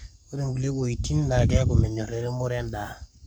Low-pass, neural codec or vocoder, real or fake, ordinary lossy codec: none; codec, 44.1 kHz, 7.8 kbps, Pupu-Codec; fake; none